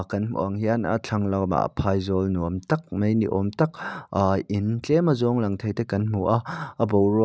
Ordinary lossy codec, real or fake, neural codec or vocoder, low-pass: none; real; none; none